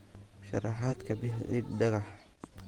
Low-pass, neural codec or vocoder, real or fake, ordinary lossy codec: 19.8 kHz; vocoder, 48 kHz, 128 mel bands, Vocos; fake; Opus, 24 kbps